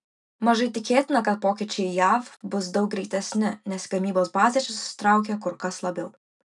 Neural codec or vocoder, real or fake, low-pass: none; real; 10.8 kHz